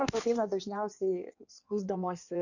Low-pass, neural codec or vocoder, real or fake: 7.2 kHz; codec, 16 kHz in and 24 kHz out, 1.1 kbps, FireRedTTS-2 codec; fake